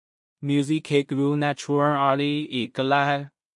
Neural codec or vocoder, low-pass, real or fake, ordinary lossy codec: codec, 16 kHz in and 24 kHz out, 0.4 kbps, LongCat-Audio-Codec, two codebook decoder; 10.8 kHz; fake; MP3, 48 kbps